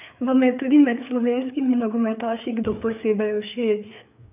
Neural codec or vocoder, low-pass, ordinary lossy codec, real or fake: codec, 16 kHz, 4 kbps, FreqCodec, larger model; 3.6 kHz; none; fake